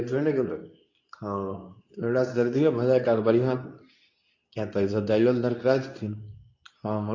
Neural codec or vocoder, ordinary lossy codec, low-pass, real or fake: codec, 24 kHz, 0.9 kbps, WavTokenizer, medium speech release version 2; AAC, 48 kbps; 7.2 kHz; fake